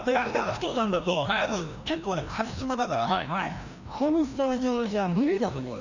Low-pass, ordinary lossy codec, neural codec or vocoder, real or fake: 7.2 kHz; none; codec, 16 kHz, 1 kbps, FreqCodec, larger model; fake